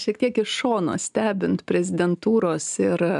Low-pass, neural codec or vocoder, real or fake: 10.8 kHz; none; real